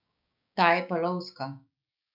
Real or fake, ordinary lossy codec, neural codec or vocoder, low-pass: fake; none; codec, 16 kHz, 6 kbps, DAC; 5.4 kHz